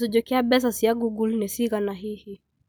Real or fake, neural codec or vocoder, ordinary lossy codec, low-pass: real; none; none; none